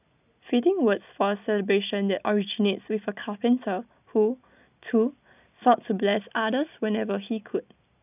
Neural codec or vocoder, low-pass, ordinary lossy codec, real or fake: none; 3.6 kHz; none; real